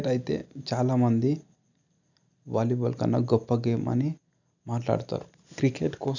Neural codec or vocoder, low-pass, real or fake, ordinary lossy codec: none; 7.2 kHz; real; none